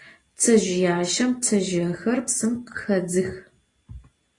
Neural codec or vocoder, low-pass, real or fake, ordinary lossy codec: none; 10.8 kHz; real; AAC, 32 kbps